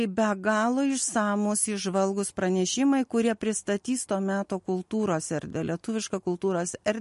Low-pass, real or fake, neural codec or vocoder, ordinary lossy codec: 14.4 kHz; real; none; MP3, 48 kbps